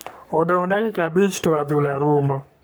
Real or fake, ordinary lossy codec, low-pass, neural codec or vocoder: fake; none; none; codec, 44.1 kHz, 3.4 kbps, Pupu-Codec